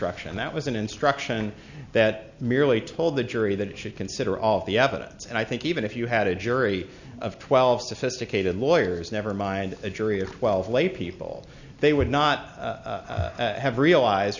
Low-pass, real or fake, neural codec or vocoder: 7.2 kHz; real; none